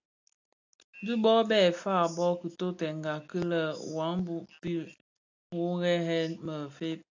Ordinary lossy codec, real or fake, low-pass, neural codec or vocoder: AAC, 48 kbps; real; 7.2 kHz; none